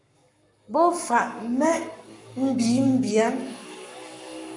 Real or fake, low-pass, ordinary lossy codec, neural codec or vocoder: fake; 10.8 kHz; MP3, 96 kbps; codec, 44.1 kHz, 7.8 kbps, Pupu-Codec